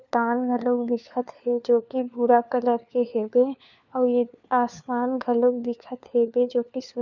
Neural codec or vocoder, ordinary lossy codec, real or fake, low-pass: codec, 16 kHz, 4 kbps, FunCodec, trained on LibriTTS, 50 frames a second; none; fake; 7.2 kHz